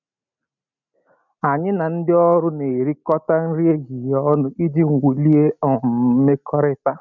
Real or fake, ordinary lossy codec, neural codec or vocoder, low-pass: real; none; none; 7.2 kHz